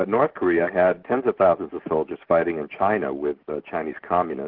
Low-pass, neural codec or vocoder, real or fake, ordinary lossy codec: 5.4 kHz; none; real; Opus, 16 kbps